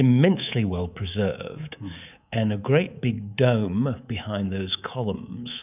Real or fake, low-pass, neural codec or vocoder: fake; 3.6 kHz; codec, 24 kHz, 3.1 kbps, DualCodec